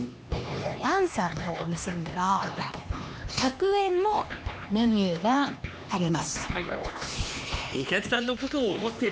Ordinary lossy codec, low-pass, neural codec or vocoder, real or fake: none; none; codec, 16 kHz, 2 kbps, X-Codec, HuBERT features, trained on LibriSpeech; fake